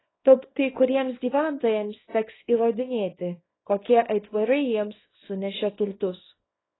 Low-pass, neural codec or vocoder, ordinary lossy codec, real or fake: 7.2 kHz; codec, 24 kHz, 0.9 kbps, WavTokenizer, small release; AAC, 16 kbps; fake